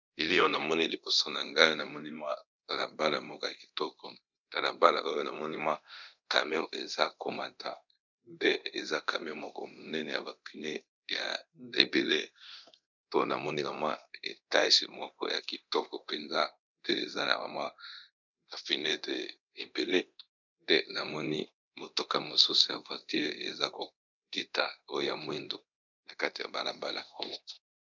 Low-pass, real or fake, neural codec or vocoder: 7.2 kHz; fake; codec, 24 kHz, 0.9 kbps, DualCodec